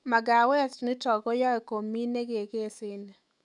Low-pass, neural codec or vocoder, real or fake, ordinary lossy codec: 10.8 kHz; autoencoder, 48 kHz, 128 numbers a frame, DAC-VAE, trained on Japanese speech; fake; none